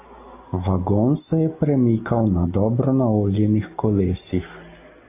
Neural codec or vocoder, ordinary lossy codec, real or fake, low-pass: none; AAC, 24 kbps; real; 3.6 kHz